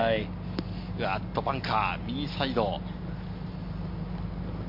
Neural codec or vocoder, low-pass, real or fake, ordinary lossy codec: none; 5.4 kHz; real; MP3, 32 kbps